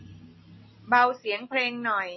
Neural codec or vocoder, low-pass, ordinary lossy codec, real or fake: none; 7.2 kHz; MP3, 24 kbps; real